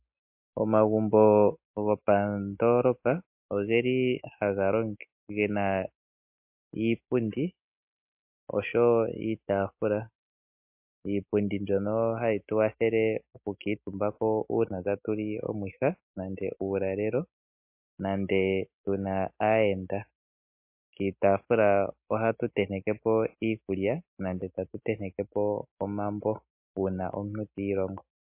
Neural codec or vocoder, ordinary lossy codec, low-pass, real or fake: none; MP3, 32 kbps; 3.6 kHz; real